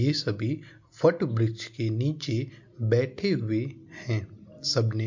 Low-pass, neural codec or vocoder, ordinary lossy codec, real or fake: 7.2 kHz; none; MP3, 48 kbps; real